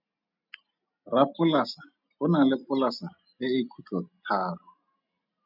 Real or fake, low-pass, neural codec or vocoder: real; 5.4 kHz; none